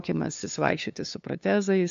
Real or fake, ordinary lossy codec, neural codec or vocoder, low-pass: fake; AAC, 64 kbps; codec, 16 kHz, 2 kbps, FunCodec, trained on LibriTTS, 25 frames a second; 7.2 kHz